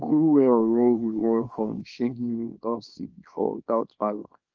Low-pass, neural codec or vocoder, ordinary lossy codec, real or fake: 7.2 kHz; codec, 24 kHz, 0.9 kbps, WavTokenizer, small release; Opus, 24 kbps; fake